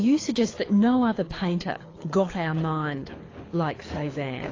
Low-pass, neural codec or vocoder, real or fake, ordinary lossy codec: 7.2 kHz; codec, 24 kHz, 6 kbps, HILCodec; fake; AAC, 32 kbps